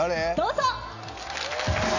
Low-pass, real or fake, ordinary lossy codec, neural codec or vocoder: 7.2 kHz; real; none; none